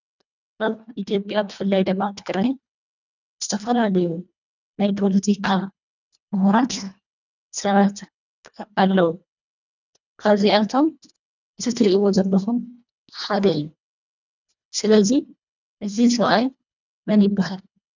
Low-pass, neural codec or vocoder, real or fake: 7.2 kHz; codec, 24 kHz, 1.5 kbps, HILCodec; fake